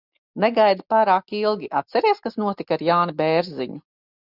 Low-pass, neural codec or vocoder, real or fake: 5.4 kHz; none; real